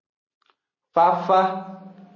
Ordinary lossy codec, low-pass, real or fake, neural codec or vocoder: MP3, 32 kbps; 7.2 kHz; real; none